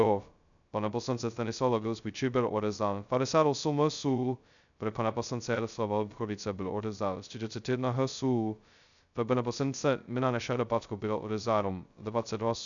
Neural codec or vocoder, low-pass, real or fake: codec, 16 kHz, 0.2 kbps, FocalCodec; 7.2 kHz; fake